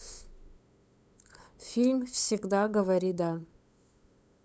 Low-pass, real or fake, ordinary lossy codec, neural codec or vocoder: none; fake; none; codec, 16 kHz, 8 kbps, FunCodec, trained on LibriTTS, 25 frames a second